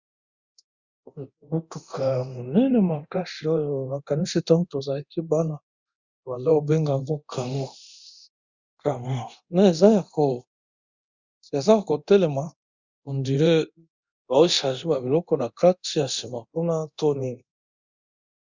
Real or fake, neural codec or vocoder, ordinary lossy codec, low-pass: fake; codec, 24 kHz, 0.9 kbps, DualCodec; Opus, 64 kbps; 7.2 kHz